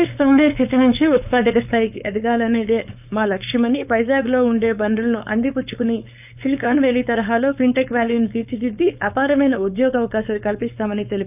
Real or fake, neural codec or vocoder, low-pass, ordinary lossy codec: fake; codec, 16 kHz, 4.8 kbps, FACodec; 3.6 kHz; none